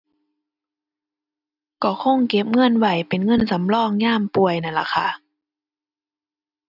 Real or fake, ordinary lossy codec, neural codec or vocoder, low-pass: real; none; none; 5.4 kHz